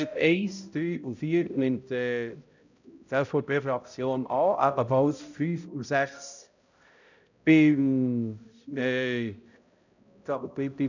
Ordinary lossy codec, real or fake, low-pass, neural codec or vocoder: none; fake; 7.2 kHz; codec, 16 kHz, 0.5 kbps, X-Codec, HuBERT features, trained on balanced general audio